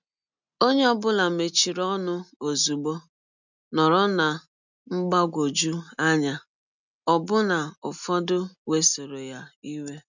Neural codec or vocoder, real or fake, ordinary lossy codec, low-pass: none; real; none; 7.2 kHz